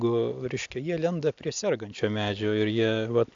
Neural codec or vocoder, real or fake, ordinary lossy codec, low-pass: none; real; AAC, 64 kbps; 7.2 kHz